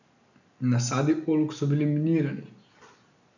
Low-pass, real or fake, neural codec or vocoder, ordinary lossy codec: 7.2 kHz; real; none; none